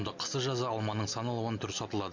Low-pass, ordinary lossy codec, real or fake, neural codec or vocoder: 7.2 kHz; MP3, 64 kbps; real; none